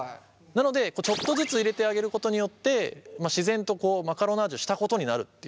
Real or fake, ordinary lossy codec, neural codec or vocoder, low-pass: real; none; none; none